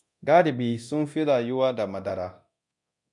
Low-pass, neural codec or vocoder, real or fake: 10.8 kHz; codec, 24 kHz, 0.9 kbps, DualCodec; fake